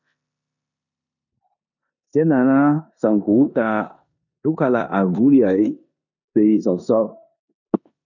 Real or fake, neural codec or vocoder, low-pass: fake; codec, 16 kHz in and 24 kHz out, 0.9 kbps, LongCat-Audio-Codec, four codebook decoder; 7.2 kHz